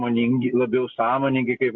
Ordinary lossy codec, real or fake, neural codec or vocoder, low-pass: MP3, 48 kbps; real; none; 7.2 kHz